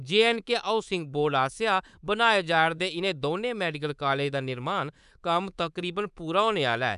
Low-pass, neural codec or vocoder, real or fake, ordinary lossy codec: 10.8 kHz; codec, 24 kHz, 3.1 kbps, DualCodec; fake; none